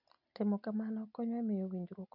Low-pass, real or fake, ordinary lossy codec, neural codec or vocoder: 5.4 kHz; real; none; none